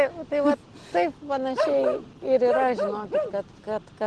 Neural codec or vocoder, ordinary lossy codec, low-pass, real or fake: none; Opus, 16 kbps; 10.8 kHz; real